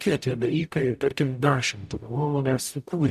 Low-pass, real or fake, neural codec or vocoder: 14.4 kHz; fake; codec, 44.1 kHz, 0.9 kbps, DAC